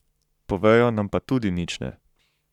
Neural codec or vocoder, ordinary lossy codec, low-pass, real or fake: codec, 44.1 kHz, 7.8 kbps, Pupu-Codec; none; 19.8 kHz; fake